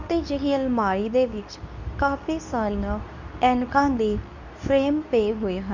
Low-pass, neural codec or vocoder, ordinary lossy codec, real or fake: 7.2 kHz; codec, 24 kHz, 0.9 kbps, WavTokenizer, medium speech release version 2; none; fake